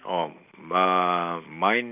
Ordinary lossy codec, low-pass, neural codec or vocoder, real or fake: none; 3.6 kHz; none; real